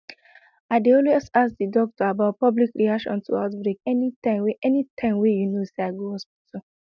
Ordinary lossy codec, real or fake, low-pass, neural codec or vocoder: none; real; 7.2 kHz; none